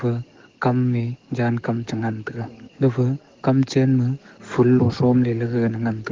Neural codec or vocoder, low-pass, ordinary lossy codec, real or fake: codec, 44.1 kHz, 7.8 kbps, DAC; 7.2 kHz; Opus, 16 kbps; fake